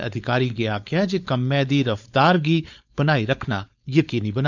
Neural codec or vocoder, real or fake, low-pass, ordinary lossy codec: codec, 16 kHz, 4.8 kbps, FACodec; fake; 7.2 kHz; none